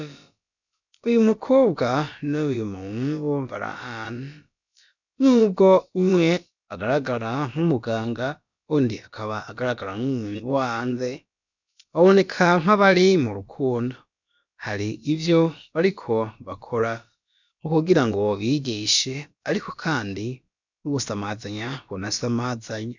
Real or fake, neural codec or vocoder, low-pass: fake; codec, 16 kHz, about 1 kbps, DyCAST, with the encoder's durations; 7.2 kHz